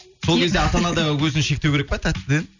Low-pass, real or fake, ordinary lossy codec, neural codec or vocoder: 7.2 kHz; real; none; none